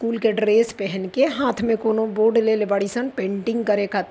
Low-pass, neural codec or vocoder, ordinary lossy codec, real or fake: none; none; none; real